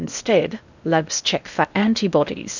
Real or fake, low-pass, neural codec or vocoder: fake; 7.2 kHz; codec, 16 kHz in and 24 kHz out, 0.8 kbps, FocalCodec, streaming, 65536 codes